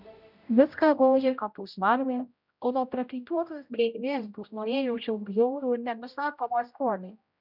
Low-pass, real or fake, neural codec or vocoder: 5.4 kHz; fake; codec, 16 kHz, 0.5 kbps, X-Codec, HuBERT features, trained on general audio